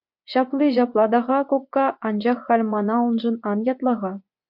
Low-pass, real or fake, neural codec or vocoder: 5.4 kHz; fake; vocoder, 24 kHz, 100 mel bands, Vocos